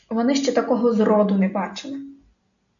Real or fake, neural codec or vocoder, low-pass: real; none; 7.2 kHz